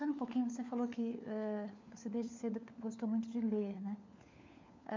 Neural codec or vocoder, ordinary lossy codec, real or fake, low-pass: codec, 16 kHz, 4 kbps, FunCodec, trained on LibriTTS, 50 frames a second; MP3, 64 kbps; fake; 7.2 kHz